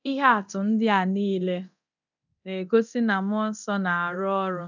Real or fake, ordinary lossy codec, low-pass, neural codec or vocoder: fake; none; 7.2 kHz; codec, 24 kHz, 0.9 kbps, DualCodec